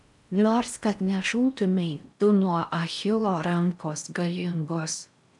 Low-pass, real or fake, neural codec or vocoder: 10.8 kHz; fake; codec, 16 kHz in and 24 kHz out, 0.6 kbps, FocalCodec, streaming, 4096 codes